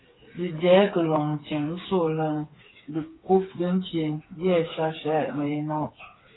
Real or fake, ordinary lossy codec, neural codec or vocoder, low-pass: fake; AAC, 16 kbps; codec, 16 kHz, 4 kbps, FreqCodec, smaller model; 7.2 kHz